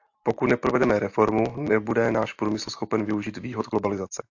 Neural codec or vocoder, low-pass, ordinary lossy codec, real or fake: none; 7.2 kHz; AAC, 48 kbps; real